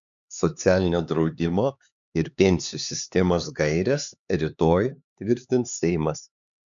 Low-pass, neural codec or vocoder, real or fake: 7.2 kHz; codec, 16 kHz, 4 kbps, X-Codec, HuBERT features, trained on LibriSpeech; fake